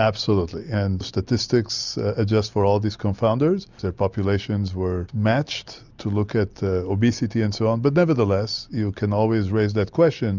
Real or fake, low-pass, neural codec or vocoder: real; 7.2 kHz; none